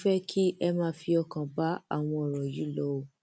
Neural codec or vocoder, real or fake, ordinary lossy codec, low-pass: none; real; none; none